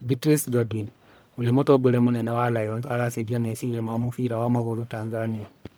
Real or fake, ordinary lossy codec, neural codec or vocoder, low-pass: fake; none; codec, 44.1 kHz, 1.7 kbps, Pupu-Codec; none